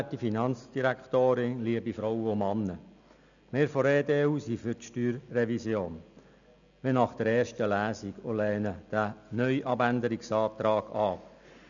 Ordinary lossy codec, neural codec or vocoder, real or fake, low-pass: none; none; real; 7.2 kHz